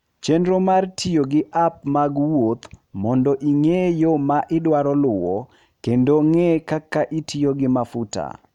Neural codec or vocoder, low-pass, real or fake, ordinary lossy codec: none; 19.8 kHz; real; none